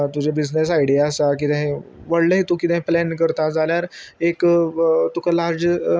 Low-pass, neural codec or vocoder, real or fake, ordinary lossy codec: none; none; real; none